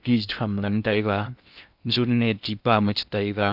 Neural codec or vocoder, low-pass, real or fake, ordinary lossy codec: codec, 16 kHz in and 24 kHz out, 0.6 kbps, FocalCodec, streaming, 2048 codes; 5.4 kHz; fake; none